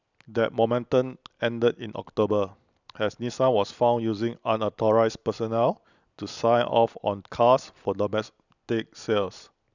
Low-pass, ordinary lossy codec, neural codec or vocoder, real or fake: 7.2 kHz; none; none; real